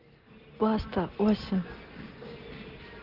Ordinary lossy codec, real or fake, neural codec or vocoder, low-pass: Opus, 16 kbps; real; none; 5.4 kHz